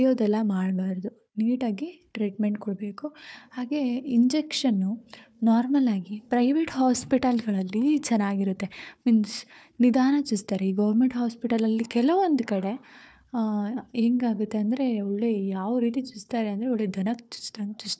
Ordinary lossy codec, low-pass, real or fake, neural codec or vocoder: none; none; fake; codec, 16 kHz, 4 kbps, FunCodec, trained on Chinese and English, 50 frames a second